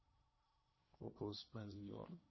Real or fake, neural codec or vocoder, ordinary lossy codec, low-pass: fake; codec, 16 kHz in and 24 kHz out, 0.8 kbps, FocalCodec, streaming, 65536 codes; MP3, 24 kbps; 7.2 kHz